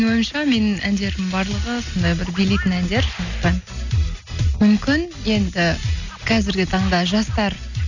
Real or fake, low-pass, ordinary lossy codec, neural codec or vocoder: real; 7.2 kHz; none; none